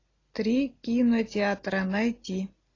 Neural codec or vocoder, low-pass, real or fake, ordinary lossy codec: none; 7.2 kHz; real; AAC, 32 kbps